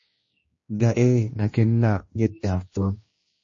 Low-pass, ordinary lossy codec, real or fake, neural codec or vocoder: 7.2 kHz; MP3, 32 kbps; fake; codec, 16 kHz, 1 kbps, X-Codec, HuBERT features, trained on general audio